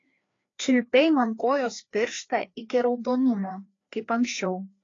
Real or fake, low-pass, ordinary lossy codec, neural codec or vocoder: fake; 7.2 kHz; AAC, 32 kbps; codec, 16 kHz, 2 kbps, FreqCodec, larger model